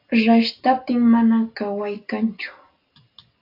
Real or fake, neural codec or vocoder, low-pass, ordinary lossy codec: real; none; 5.4 kHz; AAC, 32 kbps